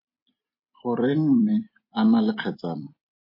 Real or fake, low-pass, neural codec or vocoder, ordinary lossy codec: fake; 5.4 kHz; vocoder, 44.1 kHz, 128 mel bands every 256 samples, BigVGAN v2; MP3, 24 kbps